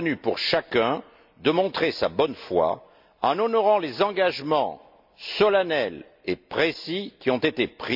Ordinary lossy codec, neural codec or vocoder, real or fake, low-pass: MP3, 48 kbps; none; real; 5.4 kHz